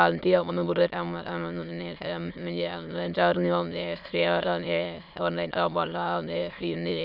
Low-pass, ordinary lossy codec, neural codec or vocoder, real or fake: 5.4 kHz; none; autoencoder, 22.05 kHz, a latent of 192 numbers a frame, VITS, trained on many speakers; fake